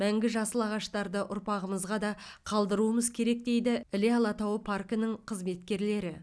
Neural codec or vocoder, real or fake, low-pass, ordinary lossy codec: none; real; none; none